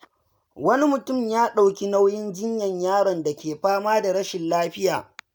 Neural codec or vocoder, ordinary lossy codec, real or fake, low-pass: none; none; real; none